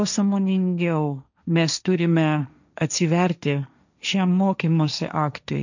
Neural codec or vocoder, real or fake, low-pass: codec, 16 kHz, 1.1 kbps, Voila-Tokenizer; fake; 7.2 kHz